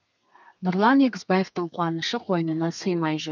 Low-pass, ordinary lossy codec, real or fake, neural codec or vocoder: 7.2 kHz; none; fake; codec, 32 kHz, 1.9 kbps, SNAC